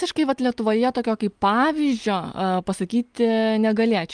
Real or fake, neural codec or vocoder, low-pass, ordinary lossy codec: real; none; 9.9 kHz; Opus, 32 kbps